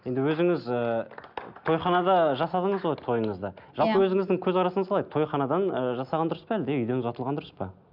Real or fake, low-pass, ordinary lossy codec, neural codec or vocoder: real; 5.4 kHz; none; none